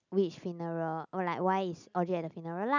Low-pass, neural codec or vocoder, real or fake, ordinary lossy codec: 7.2 kHz; none; real; none